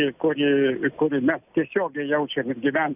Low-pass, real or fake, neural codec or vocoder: 3.6 kHz; real; none